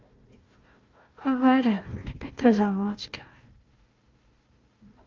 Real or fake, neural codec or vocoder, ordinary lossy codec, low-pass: fake; codec, 16 kHz, 1 kbps, FunCodec, trained on Chinese and English, 50 frames a second; Opus, 24 kbps; 7.2 kHz